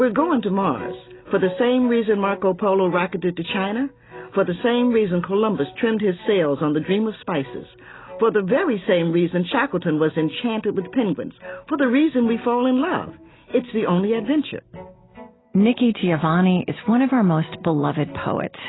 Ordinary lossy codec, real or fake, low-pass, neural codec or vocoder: AAC, 16 kbps; real; 7.2 kHz; none